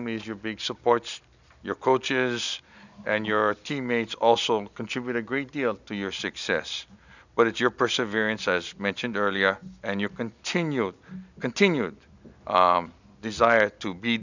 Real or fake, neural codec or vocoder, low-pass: real; none; 7.2 kHz